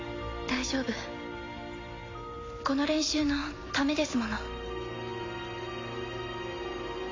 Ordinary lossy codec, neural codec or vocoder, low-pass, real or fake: AAC, 32 kbps; none; 7.2 kHz; real